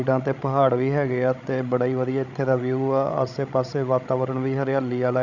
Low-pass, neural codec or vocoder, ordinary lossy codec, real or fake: 7.2 kHz; codec, 16 kHz, 16 kbps, FreqCodec, larger model; none; fake